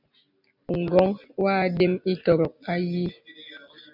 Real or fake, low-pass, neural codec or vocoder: real; 5.4 kHz; none